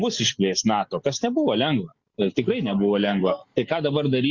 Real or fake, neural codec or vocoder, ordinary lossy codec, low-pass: real; none; Opus, 64 kbps; 7.2 kHz